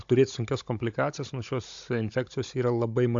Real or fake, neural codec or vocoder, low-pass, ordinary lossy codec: fake; codec, 16 kHz, 16 kbps, FunCodec, trained on Chinese and English, 50 frames a second; 7.2 kHz; AAC, 64 kbps